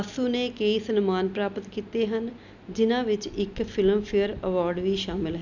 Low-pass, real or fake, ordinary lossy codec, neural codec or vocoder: 7.2 kHz; real; none; none